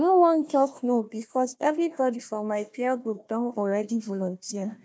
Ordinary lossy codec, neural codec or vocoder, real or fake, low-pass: none; codec, 16 kHz, 1 kbps, FunCodec, trained on Chinese and English, 50 frames a second; fake; none